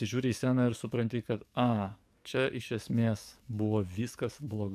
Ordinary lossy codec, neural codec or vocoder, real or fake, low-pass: AAC, 96 kbps; codec, 44.1 kHz, 7.8 kbps, DAC; fake; 14.4 kHz